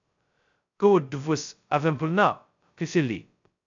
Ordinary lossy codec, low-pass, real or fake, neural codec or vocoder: AAC, 64 kbps; 7.2 kHz; fake; codec, 16 kHz, 0.2 kbps, FocalCodec